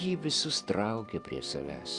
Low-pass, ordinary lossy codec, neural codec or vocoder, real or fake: 10.8 kHz; Opus, 64 kbps; vocoder, 44.1 kHz, 128 mel bands every 256 samples, BigVGAN v2; fake